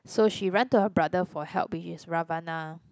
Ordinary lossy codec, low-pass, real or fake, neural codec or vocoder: none; none; real; none